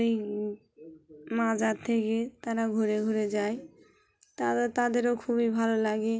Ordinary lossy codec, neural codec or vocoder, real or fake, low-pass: none; none; real; none